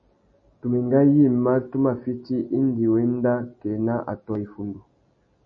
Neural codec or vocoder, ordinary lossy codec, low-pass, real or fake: none; MP3, 32 kbps; 7.2 kHz; real